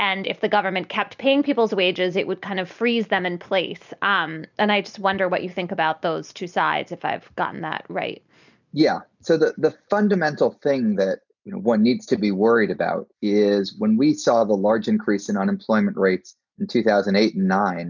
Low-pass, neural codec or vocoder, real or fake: 7.2 kHz; none; real